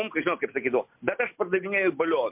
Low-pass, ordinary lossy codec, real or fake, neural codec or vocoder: 3.6 kHz; MP3, 32 kbps; real; none